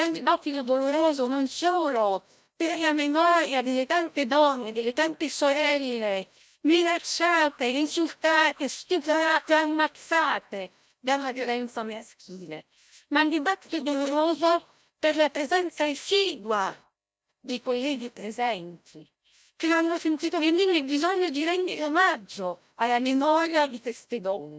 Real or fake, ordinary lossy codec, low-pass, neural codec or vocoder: fake; none; none; codec, 16 kHz, 0.5 kbps, FreqCodec, larger model